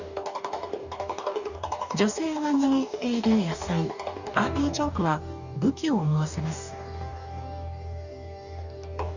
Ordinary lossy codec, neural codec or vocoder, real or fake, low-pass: none; codec, 44.1 kHz, 2.6 kbps, DAC; fake; 7.2 kHz